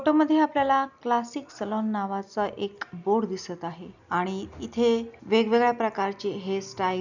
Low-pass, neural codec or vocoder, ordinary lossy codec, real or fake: 7.2 kHz; none; none; real